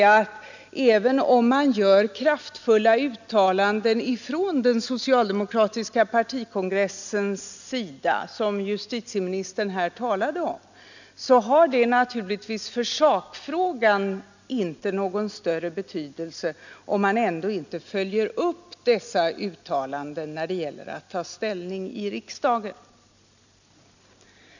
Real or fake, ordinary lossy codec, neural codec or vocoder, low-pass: real; none; none; 7.2 kHz